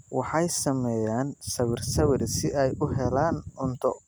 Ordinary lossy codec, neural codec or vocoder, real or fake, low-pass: none; none; real; none